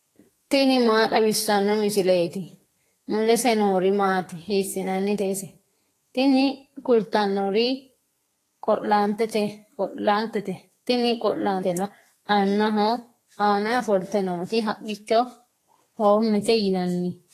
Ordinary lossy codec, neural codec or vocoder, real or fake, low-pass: AAC, 48 kbps; codec, 32 kHz, 1.9 kbps, SNAC; fake; 14.4 kHz